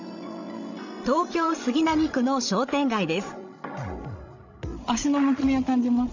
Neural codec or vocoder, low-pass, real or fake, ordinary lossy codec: codec, 16 kHz, 16 kbps, FreqCodec, larger model; 7.2 kHz; fake; none